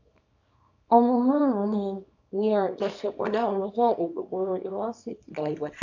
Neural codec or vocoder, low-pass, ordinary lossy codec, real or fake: codec, 24 kHz, 0.9 kbps, WavTokenizer, small release; 7.2 kHz; AAC, 48 kbps; fake